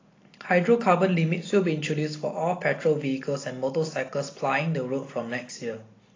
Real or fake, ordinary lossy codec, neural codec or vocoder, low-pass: real; AAC, 32 kbps; none; 7.2 kHz